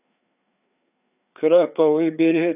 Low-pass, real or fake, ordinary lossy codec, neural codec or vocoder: 3.6 kHz; fake; none; codec, 16 kHz, 4 kbps, FreqCodec, larger model